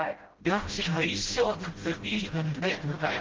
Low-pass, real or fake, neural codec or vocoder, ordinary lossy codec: 7.2 kHz; fake; codec, 16 kHz, 0.5 kbps, FreqCodec, smaller model; Opus, 16 kbps